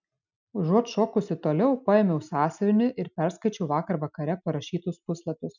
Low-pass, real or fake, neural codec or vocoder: 7.2 kHz; real; none